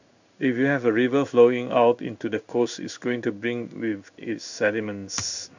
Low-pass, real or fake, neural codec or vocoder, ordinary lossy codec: 7.2 kHz; fake; codec, 16 kHz in and 24 kHz out, 1 kbps, XY-Tokenizer; none